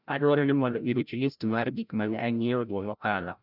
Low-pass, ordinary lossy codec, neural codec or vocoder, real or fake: 5.4 kHz; none; codec, 16 kHz, 0.5 kbps, FreqCodec, larger model; fake